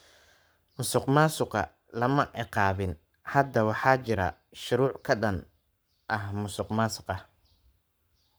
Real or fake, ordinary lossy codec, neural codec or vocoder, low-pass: fake; none; codec, 44.1 kHz, 7.8 kbps, Pupu-Codec; none